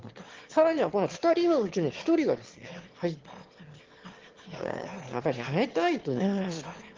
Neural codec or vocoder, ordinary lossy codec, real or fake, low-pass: autoencoder, 22.05 kHz, a latent of 192 numbers a frame, VITS, trained on one speaker; Opus, 16 kbps; fake; 7.2 kHz